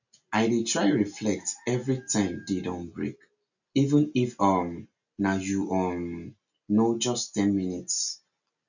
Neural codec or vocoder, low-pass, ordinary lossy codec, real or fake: none; 7.2 kHz; none; real